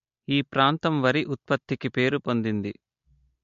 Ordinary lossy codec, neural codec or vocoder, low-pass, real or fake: MP3, 48 kbps; none; 7.2 kHz; real